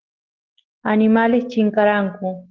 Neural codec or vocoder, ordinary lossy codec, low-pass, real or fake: none; Opus, 16 kbps; 7.2 kHz; real